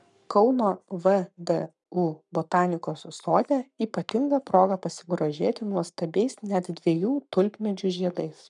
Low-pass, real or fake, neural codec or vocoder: 10.8 kHz; fake; codec, 44.1 kHz, 7.8 kbps, Pupu-Codec